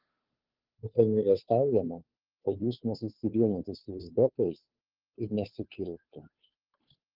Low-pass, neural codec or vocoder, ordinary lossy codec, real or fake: 5.4 kHz; codec, 16 kHz, 2 kbps, FunCodec, trained on Chinese and English, 25 frames a second; Opus, 32 kbps; fake